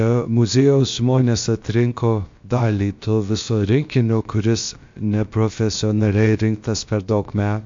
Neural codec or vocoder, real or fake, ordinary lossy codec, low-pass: codec, 16 kHz, about 1 kbps, DyCAST, with the encoder's durations; fake; MP3, 48 kbps; 7.2 kHz